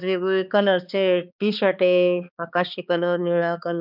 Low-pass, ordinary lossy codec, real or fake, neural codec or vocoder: 5.4 kHz; none; fake; codec, 16 kHz, 2 kbps, X-Codec, HuBERT features, trained on balanced general audio